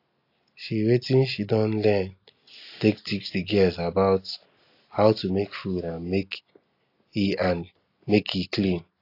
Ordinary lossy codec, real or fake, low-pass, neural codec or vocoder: AAC, 32 kbps; real; 5.4 kHz; none